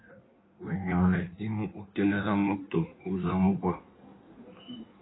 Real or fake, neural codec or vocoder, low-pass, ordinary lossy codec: fake; codec, 16 kHz in and 24 kHz out, 1.1 kbps, FireRedTTS-2 codec; 7.2 kHz; AAC, 16 kbps